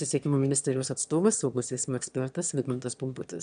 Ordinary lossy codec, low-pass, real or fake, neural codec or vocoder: MP3, 96 kbps; 9.9 kHz; fake; autoencoder, 22.05 kHz, a latent of 192 numbers a frame, VITS, trained on one speaker